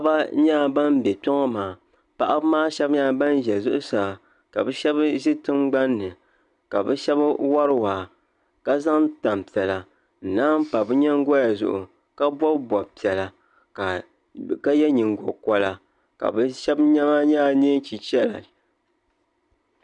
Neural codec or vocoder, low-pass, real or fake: none; 10.8 kHz; real